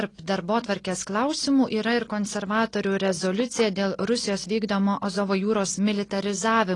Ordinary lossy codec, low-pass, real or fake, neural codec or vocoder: AAC, 32 kbps; 10.8 kHz; real; none